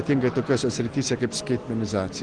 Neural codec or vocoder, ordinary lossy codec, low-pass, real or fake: none; Opus, 16 kbps; 9.9 kHz; real